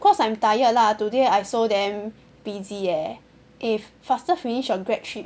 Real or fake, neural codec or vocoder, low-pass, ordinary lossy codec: real; none; none; none